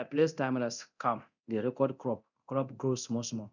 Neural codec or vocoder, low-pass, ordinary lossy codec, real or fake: codec, 24 kHz, 0.9 kbps, DualCodec; 7.2 kHz; none; fake